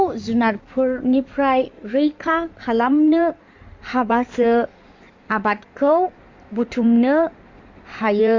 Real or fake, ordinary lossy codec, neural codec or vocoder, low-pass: fake; MP3, 48 kbps; codec, 16 kHz in and 24 kHz out, 2.2 kbps, FireRedTTS-2 codec; 7.2 kHz